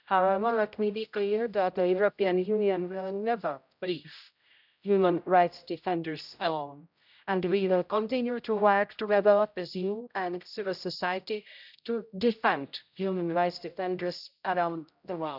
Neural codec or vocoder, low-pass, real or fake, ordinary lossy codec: codec, 16 kHz, 0.5 kbps, X-Codec, HuBERT features, trained on general audio; 5.4 kHz; fake; none